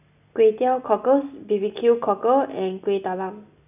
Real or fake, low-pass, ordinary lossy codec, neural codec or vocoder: real; 3.6 kHz; none; none